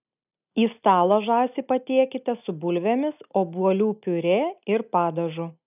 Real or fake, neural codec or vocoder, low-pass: real; none; 3.6 kHz